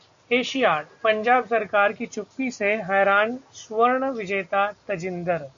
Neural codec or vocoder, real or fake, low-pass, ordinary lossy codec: none; real; 7.2 kHz; AAC, 64 kbps